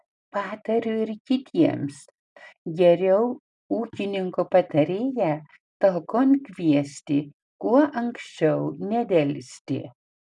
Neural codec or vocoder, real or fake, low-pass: none; real; 10.8 kHz